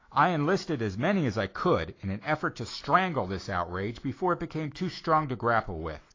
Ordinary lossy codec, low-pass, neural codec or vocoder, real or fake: AAC, 32 kbps; 7.2 kHz; autoencoder, 48 kHz, 128 numbers a frame, DAC-VAE, trained on Japanese speech; fake